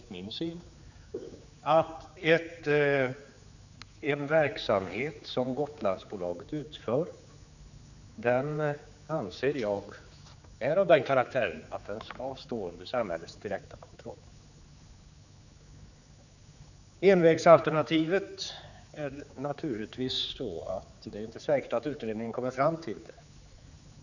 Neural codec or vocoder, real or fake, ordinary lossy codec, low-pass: codec, 16 kHz, 4 kbps, X-Codec, HuBERT features, trained on general audio; fake; none; 7.2 kHz